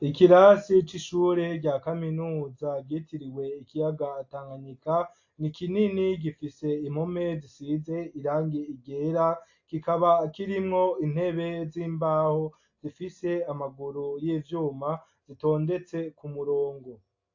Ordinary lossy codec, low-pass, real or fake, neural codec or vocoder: AAC, 48 kbps; 7.2 kHz; real; none